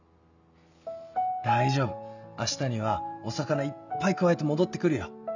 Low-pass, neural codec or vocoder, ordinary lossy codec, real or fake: 7.2 kHz; none; none; real